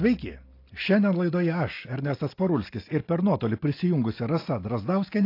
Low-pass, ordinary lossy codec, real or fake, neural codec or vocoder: 5.4 kHz; AAC, 32 kbps; real; none